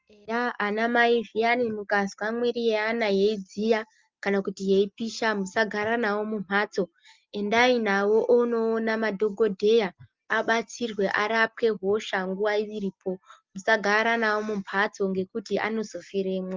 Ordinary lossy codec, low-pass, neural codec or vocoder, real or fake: Opus, 32 kbps; 7.2 kHz; none; real